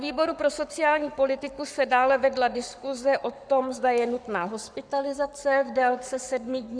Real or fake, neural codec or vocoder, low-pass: fake; codec, 44.1 kHz, 7.8 kbps, Pupu-Codec; 9.9 kHz